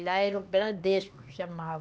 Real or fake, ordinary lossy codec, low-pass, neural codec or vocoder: fake; none; none; codec, 16 kHz, 2 kbps, X-Codec, HuBERT features, trained on LibriSpeech